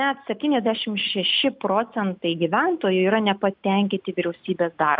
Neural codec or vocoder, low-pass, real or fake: none; 5.4 kHz; real